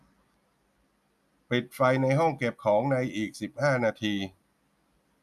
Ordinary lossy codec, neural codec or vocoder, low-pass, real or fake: none; none; 14.4 kHz; real